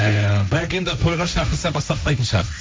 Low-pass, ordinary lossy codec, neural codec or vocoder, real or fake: none; none; codec, 16 kHz, 1.1 kbps, Voila-Tokenizer; fake